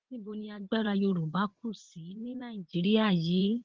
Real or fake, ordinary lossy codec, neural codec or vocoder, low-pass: fake; Opus, 16 kbps; vocoder, 44.1 kHz, 80 mel bands, Vocos; 7.2 kHz